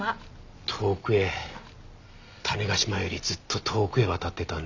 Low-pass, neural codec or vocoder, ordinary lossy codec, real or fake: 7.2 kHz; none; none; real